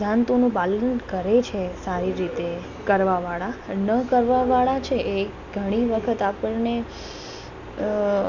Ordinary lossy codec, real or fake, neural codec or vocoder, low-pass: MP3, 48 kbps; real; none; 7.2 kHz